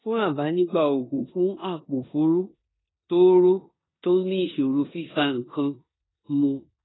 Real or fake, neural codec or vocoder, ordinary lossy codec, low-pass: fake; codec, 16 kHz in and 24 kHz out, 0.9 kbps, LongCat-Audio-Codec, four codebook decoder; AAC, 16 kbps; 7.2 kHz